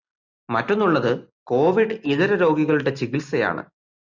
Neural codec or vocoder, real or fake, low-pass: none; real; 7.2 kHz